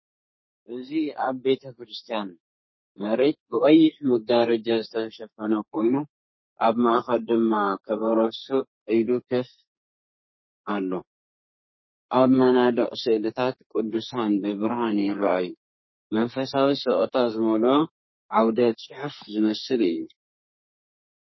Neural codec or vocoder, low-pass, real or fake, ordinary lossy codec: codec, 32 kHz, 1.9 kbps, SNAC; 7.2 kHz; fake; MP3, 24 kbps